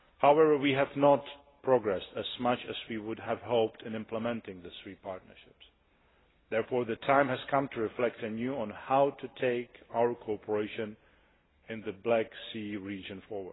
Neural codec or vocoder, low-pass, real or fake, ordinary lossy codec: none; 7.2 kHz; real; AAC, 16 kbps